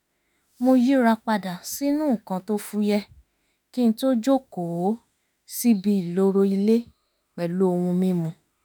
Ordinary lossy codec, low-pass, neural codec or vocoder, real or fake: none; none; autoencoder, 48 kHz, 32 numbers a frame, DAC-VAE, trained on Japanese speech; fake